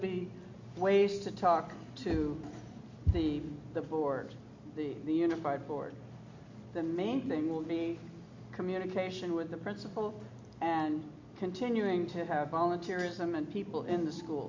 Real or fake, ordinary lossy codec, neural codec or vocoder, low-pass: real; MP3, 64 kbps; none; 7.2 kHz